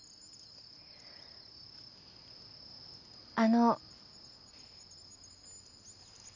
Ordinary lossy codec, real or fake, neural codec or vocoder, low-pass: none; real; none; 7.2 kHz